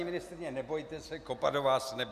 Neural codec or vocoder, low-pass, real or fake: none; 14.4 kHz; real